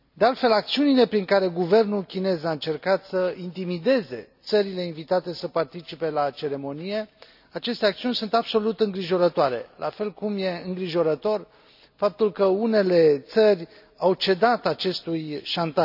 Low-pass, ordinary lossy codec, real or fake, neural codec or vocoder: 5.4 kHz; none; real; none